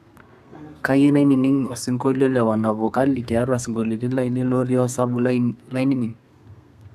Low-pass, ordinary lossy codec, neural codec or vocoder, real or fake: 14.4 kHz; none; codec, 32 kHz, 1.9 kbps, SNAC; fake